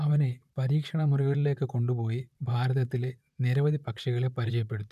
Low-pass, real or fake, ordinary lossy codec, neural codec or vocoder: 14.4 kHz; fake; none; vocoder, 44.1 kHz, 128 mel bands, Pupu-Vocoder